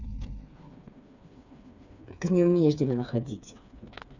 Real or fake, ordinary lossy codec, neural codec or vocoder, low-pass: fake; none; codec, 16 kHz, 4 kbps, FreqCodec, smaller model; 7.2 kHz